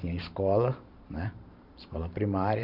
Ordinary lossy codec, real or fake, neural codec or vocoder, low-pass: none; fake; vocoder, 44.1 kHz, 128 mel bands every 512 samples, BigVGAN v2; 5.4 kHz